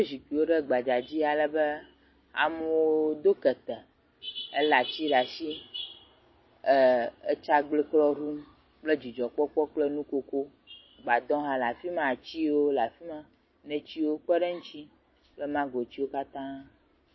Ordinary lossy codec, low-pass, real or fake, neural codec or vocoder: MP3, 24 kbps; 7.2 kHz; real; none